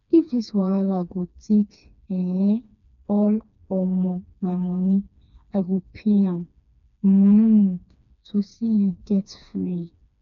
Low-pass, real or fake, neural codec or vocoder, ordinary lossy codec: 7.2 kHz; fake; codec, 16 kHz, 2 kbps, FreqCodec, smaller model; none